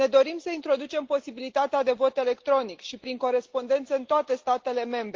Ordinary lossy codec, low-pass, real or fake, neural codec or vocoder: Opus, 16 kbps; 7.2 kHz; real; none